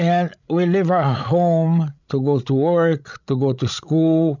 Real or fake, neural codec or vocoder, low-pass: fake; codec, 16 kHz, 16 kbps, FreqCodec, larger model; 7.2 kHz